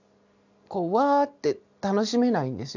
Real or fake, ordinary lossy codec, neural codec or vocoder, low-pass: real; none; none; 7.2 kHz